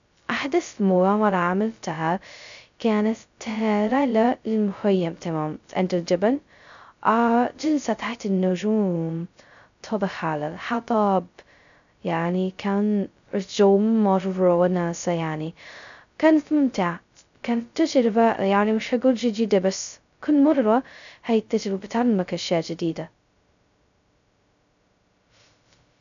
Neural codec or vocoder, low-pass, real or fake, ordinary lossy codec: codec, 16 kHz, 0.2 kbps, FocalCodec; 7.2 kHz; fake; AAC, 96 kbps